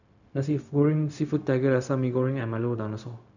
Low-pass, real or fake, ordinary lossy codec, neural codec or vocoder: 7.2 kHz; fake; none; codec, 16 kHz, 0.4 kbps, LongCat-Audio-Codec